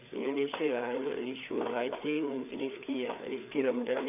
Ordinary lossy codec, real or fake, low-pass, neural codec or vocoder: none; fake; 3.6 kHz; codec, 16 kHz, 4 kbps, FreqCodec, larger model